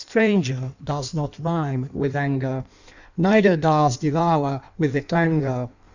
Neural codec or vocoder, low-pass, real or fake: codec, 16 kHz in and 24 kHz out, 1.1 kbps, FireRedTTS-2 codec; 7.2 kHz; fake